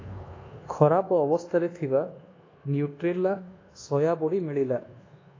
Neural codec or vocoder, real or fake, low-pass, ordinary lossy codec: codec, 24 kHz, 1.2 kbps, DualCodec; fake; 7.2 kHz; AAC, 32 kbps